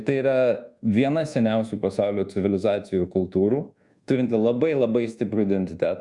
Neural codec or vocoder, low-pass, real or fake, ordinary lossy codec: codec, 24 kHz, 1.2 kbps, DualCodec; 10.8 kHz; fake; Opus, 64 kbps